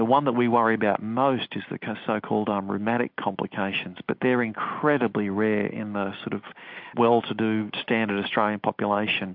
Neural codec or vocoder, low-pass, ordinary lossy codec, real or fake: none; 5.4 kHz; MP3, 48 kbps; real